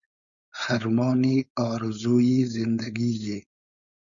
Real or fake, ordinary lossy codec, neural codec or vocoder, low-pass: fake; Opus, 64 kbps; codec, 16 kHz, 4.8 kbps, FACodec; 7.2 kHz